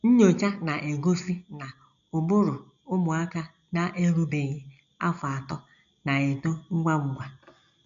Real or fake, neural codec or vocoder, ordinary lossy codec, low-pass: real; none; MP3, 96 kbps; 7.2 kHz